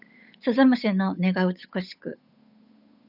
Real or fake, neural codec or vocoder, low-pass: fake; codec, 16 kHz, 8 kbps, FunCodec, trained on Chinese and English, 25 frames a second; 5.4 kHz